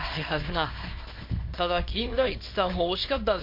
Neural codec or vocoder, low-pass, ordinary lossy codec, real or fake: codec, 24 kHz, 0.9 kbps, WavTokenizer, small release; 5.4 kHz; MP3, 32 kbps; fake